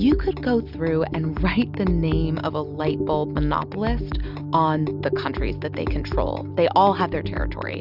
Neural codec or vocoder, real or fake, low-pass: none; real; 5.4 kHz